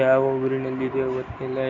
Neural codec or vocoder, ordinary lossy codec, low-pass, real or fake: none; none; 7.2 kHz; real